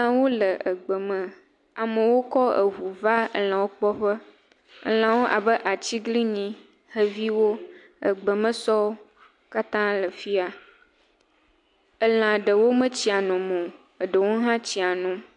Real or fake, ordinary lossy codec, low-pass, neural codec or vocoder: real; MP3, 64 kbps; 10.8 kHz; none